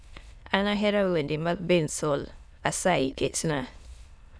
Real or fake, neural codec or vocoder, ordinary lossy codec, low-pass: fake; autoencoder, 22.05 kHz, a latent of 192 numbers a frame, VITS, trained on many speakers; none; none